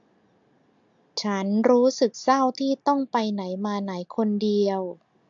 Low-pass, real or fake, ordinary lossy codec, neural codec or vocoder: 7.2 kHz; real; AAC, 64 kbps; none